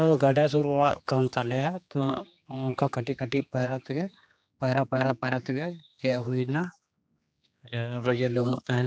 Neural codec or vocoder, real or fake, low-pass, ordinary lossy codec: codec, 16 kHz, 2 kbps, X-Codec, HuBERT features, trained on general audio; fake; none; none